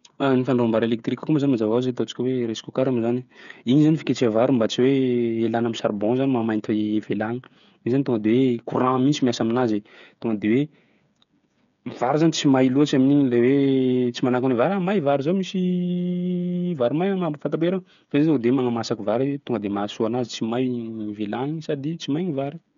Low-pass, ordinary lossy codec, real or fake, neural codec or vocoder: 7.2 kHz; none; fake; codec, 16 kHz, 8 kbps, FreqCodec, smaller model